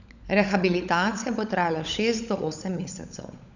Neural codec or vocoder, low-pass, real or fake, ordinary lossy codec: codec, 16 kHz, 16 kbps, FunCodec, trained on LibriTTS, 50 frames a second; 7.2 kHz; fake; none